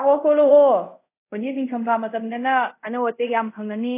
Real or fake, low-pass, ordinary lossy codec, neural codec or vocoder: fake; 3.6 kHz; AAC, 24 kbps; codec, 24 kHz, 0.5 kbps, DualCodec